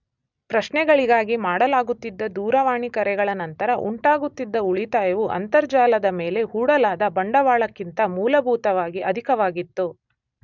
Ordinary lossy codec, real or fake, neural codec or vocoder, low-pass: none; real; none; 7.2 kHz